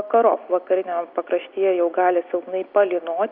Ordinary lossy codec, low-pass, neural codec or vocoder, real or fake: Opus, 64 kbps; 5.4 kHz; none; real